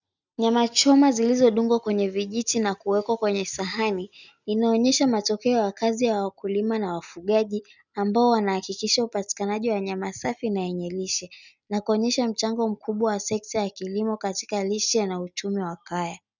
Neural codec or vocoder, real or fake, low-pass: none; real; 7.2 kHz